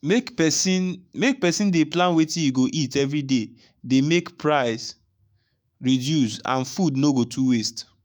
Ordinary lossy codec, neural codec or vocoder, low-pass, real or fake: none; autoencoder, 48 kHz, 128 numbers a frame, DAC-VAE, trained on Japanese speech; none; fake